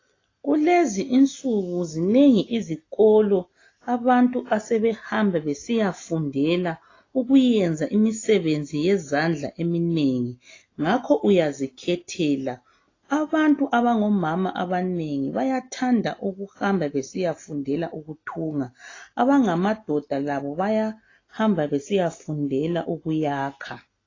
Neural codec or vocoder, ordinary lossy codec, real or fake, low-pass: none; AAC, 32 kbps; real; 7.2 kHz